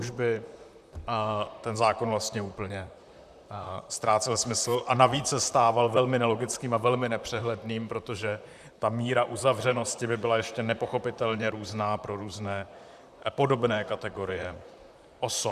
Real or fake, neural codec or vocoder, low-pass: fake; vocoder, 44.1 kHz, 128 mel bands, Pupu-Vocoder; 14.4 kHz